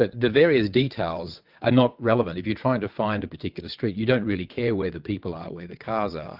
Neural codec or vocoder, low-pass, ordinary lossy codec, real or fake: vocoder, 22.05 kHz, 80 mel bands, WaveNeXt; 5.4 kHz; Opus, 32 kbps; fake